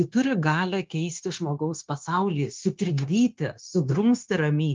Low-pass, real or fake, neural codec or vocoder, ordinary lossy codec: 7.2 kHz; fake; codec, 16 kHz, 0.9 kbps, LongCat-Audio-Codec; Opus, 32 kbps